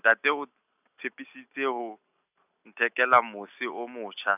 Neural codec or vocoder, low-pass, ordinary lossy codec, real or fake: none; 3.6 kHz; none; real